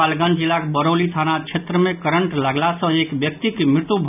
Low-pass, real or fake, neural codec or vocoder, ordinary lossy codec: 3.6 kHz; real; none; none